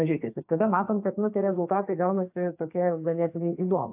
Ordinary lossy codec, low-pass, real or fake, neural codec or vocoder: AAC, 32 kbps; 3.6 kHz; fake; codec, 44.1 kHz, 2.6 kbps, SNAC